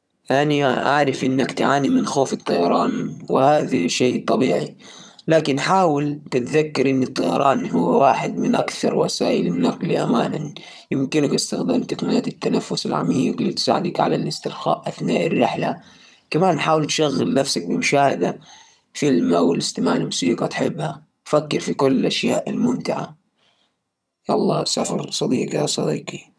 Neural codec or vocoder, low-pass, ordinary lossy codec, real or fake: vocoder, 22.05 kHz, 80 mel bands, HiFi-GAN; none; none; fake